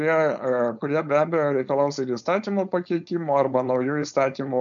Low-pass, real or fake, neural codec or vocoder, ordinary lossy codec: 7.2 kHz; fake; codec, 16 kHz, 4.8 kbps, FACodec; MP3, 96 kbps